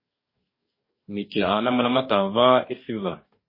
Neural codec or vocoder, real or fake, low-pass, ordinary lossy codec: codec, 44.1 kHz, 2.6 kbps, DAC; fake; 5.4 kHz; MP3, 24 kbps